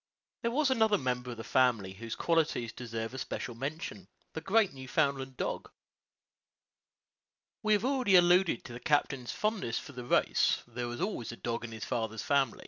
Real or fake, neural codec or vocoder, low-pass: real; none; 7.2 kHz